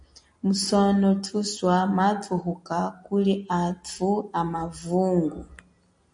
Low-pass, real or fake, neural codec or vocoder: 9.9 kHz; real; none